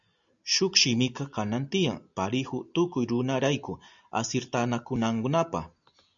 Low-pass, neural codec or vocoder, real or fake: 7.2 kHz; none; real